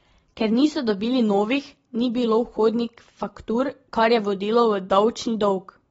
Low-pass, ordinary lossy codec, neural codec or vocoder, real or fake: 19.8 kHz; AAC, 24 kbps; none; real